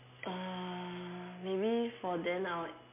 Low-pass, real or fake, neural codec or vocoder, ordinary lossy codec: 3.6 kHz; real; none; MP3, 24 kbps